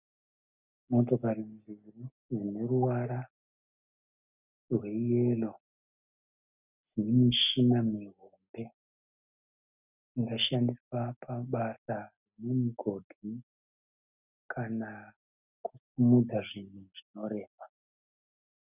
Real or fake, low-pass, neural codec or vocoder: real; 3.6 kHz; none